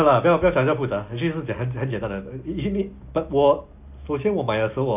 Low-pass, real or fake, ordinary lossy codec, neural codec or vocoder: 3.6 kHz; real; AAC, 32 kbps; none